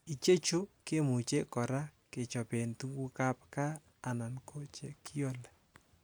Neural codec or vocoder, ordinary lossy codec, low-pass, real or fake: none; none; none; real